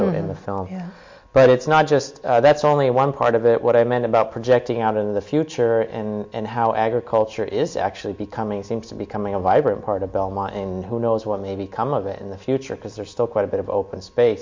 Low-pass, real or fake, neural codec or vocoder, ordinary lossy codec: 7.2 kHz; real; none; MP3, 48 kbps